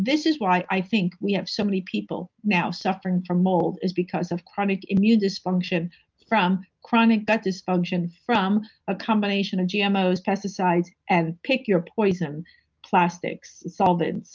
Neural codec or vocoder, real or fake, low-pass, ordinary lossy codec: none; real; 7.2 kHz; Opus, 24 kbps